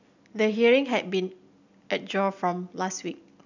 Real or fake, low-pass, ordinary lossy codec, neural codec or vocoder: real; 7.2 kHz; none; none